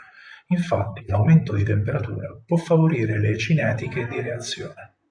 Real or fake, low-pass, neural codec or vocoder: fake; 9.9 kHz; vocoder, 44.1 kHz, 128 mel bands, Pupu-Vocoder